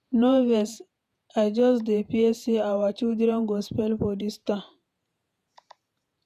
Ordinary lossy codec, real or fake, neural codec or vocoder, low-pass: none; fake; vocoder, 48 kHz, 128 mel bands, Vocos; 14.4 kHz